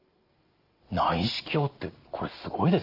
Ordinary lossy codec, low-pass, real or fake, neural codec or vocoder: Opus, 32 kbps; 5.4 kHz; real; none